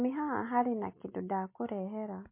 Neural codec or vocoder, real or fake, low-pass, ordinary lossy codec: none; real; 3.6 kHz; MP3, 24 kbps